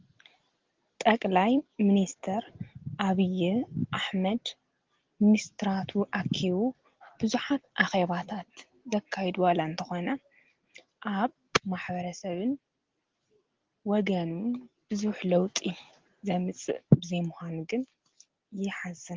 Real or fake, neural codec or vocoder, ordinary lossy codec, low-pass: real; none; Opus, 16 kbps; 7.2 kHz